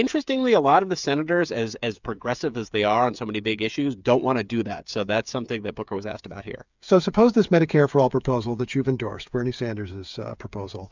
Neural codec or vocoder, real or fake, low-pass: codec, 16 kHz, 8 kbps, FreqCodec, smaller model; fake; 7.2 kHz